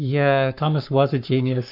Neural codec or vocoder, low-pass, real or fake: codec, 44.1 kHz, 7.8 kbps, Pupu-Codec; 5.4 kHz; fake